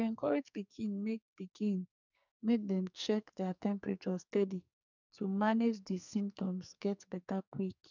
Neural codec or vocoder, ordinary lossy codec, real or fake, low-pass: codec, 44.1 kHz, 2.6 kbps, SNAC; none; fake; 7.2 kHz